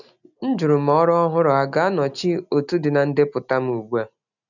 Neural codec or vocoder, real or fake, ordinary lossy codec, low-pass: none; real; none; 7.2 kHz